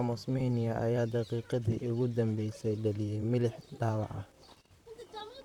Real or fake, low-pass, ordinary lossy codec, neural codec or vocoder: fake; 19.8 kHz; none; vocoder, 44.1 kHz, 128 mel bands, Pupu-Vocoder